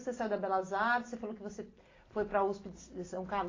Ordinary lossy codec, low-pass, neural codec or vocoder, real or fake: AAC, 32 kbps; 7.2 kHz; none; real